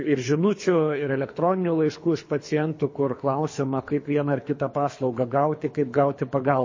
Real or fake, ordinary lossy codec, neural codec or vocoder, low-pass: fake; MP3, 32 kbps; codec, 24 kHz, 3 kbps, HILCodec; 7.2 kHz